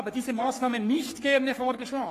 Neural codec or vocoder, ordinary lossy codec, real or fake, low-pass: codec, 44.1 kHz, 3.4 kbps, Pupu-Codec; AAC, 48 kbps; fake; 14.4 kHz